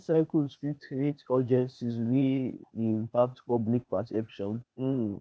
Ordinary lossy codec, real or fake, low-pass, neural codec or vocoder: none; fake; none; codec, 16 kHz, 0.8 kbps, ZipCodec